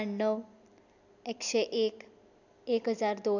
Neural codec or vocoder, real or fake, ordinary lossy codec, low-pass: none; real; none; 7.2 kHz